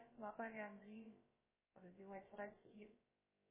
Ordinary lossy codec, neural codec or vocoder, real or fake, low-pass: MP3, 16 kbps; codec, 16 kHz, about 1 kbps, DyCAST, with the encoder's durations; fake; 3.6 kHz